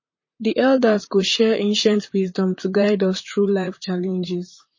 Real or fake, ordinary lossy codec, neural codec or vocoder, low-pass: fake; MP3, 32 kbps; vocoder, 44.1 kHz, 128 mel bands, Pupu-Vocoder; 7.2 kHz